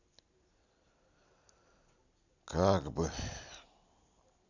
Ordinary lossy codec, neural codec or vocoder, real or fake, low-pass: none; none; real; 7.2 kHz